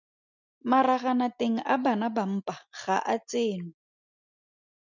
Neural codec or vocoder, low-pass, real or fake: none; 7.2 kHz; real